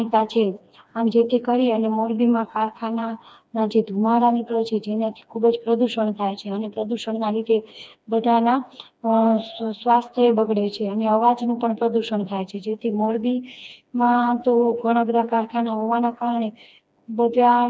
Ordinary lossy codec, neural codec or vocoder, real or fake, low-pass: none; codec, 16 kHz, 2 kbps, FreqCodec, smaller model; fake; none